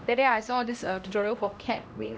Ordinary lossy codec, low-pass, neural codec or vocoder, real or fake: none; none; codec, 16 kHz, 1 kbps, X-Codec, HuBERT features, trained on LibriSpeech; fake